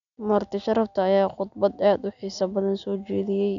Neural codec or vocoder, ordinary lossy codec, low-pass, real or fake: none; none; 7.2 kHz; real